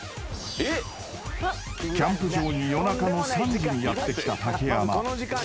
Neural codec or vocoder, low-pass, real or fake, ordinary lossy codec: none; none; real; none